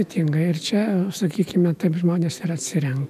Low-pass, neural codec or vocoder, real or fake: 14.4 kHz; autoencoder, 48 kHz, 128 numbers a frame, DAC-VAE, trained on Japanese speech; fake